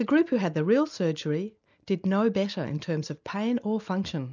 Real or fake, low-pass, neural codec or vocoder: real; 7.2 kHz; none